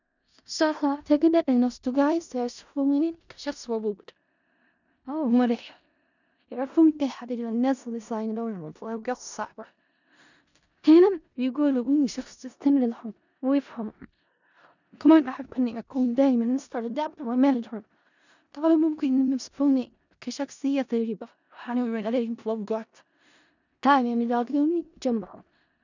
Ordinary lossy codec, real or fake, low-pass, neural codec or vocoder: none; fake; 7.2 kHz; codec, 16 kHz in and 24 kHz out, 0.4 kbps, LongCat-Audio-Codec, four codebook decoder